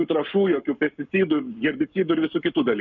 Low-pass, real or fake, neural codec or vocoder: 7.2 kHz; fake; codec, 44.1 kHz, 7.8 kbps, Pupu-Codec